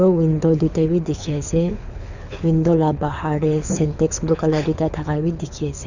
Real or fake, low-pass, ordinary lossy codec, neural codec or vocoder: fake; 7.2 kHz; none; codec, 24 kHz, 6 kbps, HILCodec